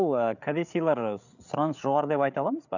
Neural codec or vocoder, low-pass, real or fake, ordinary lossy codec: codec, 16 kHz, 8 kbps, FreqCodec, larger model; 7.2 kHz; fake; none